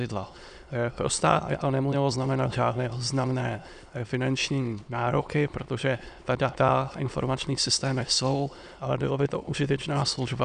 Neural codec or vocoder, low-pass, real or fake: autoencoder, 22.05 kHz, a latent of 192 numbers a frame, VITS, trained on many speakers; 9.9 kHz; fake